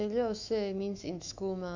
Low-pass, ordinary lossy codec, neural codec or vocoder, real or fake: 7.2 kHz; none; autoencoder, 48 kHz, 128 numbers a frame, DAC-VAE, trained on Japanese speech; fake